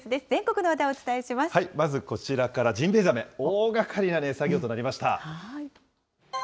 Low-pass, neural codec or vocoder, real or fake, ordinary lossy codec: none; none; real; none